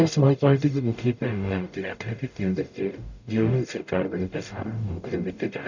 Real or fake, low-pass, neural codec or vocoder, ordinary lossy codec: fake; 7.2 kHz; codec, 44.1 kHz, 0.9 kbps, DAC; none